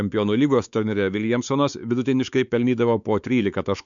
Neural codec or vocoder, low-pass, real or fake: codec, 16 kHz, 4 kbps, X-Codec, WavLM features, trained on Multilingual LibriSpeech; 7.2 kHz; fake